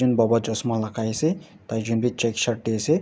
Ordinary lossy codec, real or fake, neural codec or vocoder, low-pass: none; real; none; none